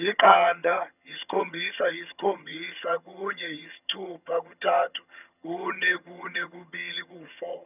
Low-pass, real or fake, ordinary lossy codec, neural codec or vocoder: 3.6 kHz; fake; none; vocoder, 22.05 kHz, 80 mel bands, HiFi-GAN